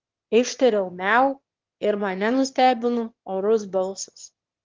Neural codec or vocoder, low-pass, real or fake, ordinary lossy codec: autoencoder, 22.05 kHz, a latent of 192 numbers a frame, VITS, trained on one speaker; 7.2 kHz; fake; Opus, 16 kbps